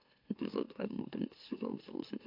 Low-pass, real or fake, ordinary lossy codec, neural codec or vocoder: 5.4 kHz; fake; AAC, 32 kbps; autoencoder, 44.1 kHz, a latent of 192 numbers a frame, MeloTTS